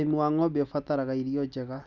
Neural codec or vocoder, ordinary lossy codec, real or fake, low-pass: none; none; real; 7.2 kHz